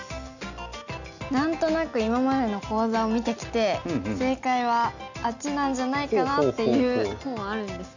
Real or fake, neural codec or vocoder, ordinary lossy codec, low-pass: real; none; none; 7.2 kHz